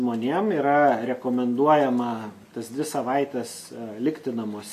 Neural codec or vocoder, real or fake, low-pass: none; real; 14.4 kHz